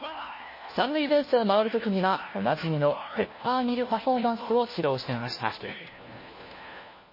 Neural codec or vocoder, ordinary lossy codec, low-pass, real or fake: codec, 16 kHz, 1 kbps, FunCodec, trained on LibriTTS, 50 frames a second; MP3, 24 kbps; 5.4 kHz; fake